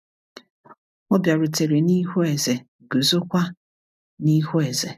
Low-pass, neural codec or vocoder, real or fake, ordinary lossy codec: 14.4 kHz; none; real; none